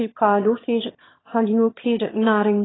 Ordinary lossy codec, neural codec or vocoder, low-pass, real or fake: AAC, 16 kbps; autoencoder, 22.05 kHz, a latent of 192 numbers a frame, VITS, trained on one speaker; 7.2 kHz; fake